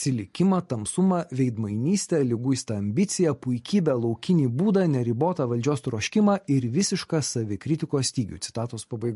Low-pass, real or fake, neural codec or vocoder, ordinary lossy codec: 14.4 kHz; real; none; MP3, 48 kbps